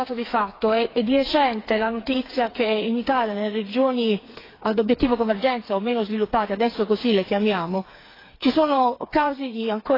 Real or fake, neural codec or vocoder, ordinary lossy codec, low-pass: fake; codec, 16 kHz, 4 kbps, FreqCodec, smaller model; AAC, 24 kbps; 5.4 kHz